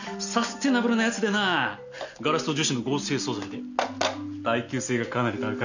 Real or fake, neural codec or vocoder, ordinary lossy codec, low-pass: real; none; none; 7.2 kHz